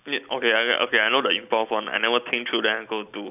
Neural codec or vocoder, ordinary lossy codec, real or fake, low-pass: none; none; real; 3.6 kHz